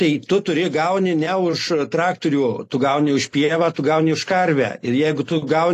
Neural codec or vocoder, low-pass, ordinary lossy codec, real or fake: none; 14.4 kHz; AAC, 48 kbps; real